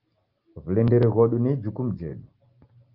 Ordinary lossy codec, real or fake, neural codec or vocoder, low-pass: Opus, 32 kbps; real; none; 5.4 kHz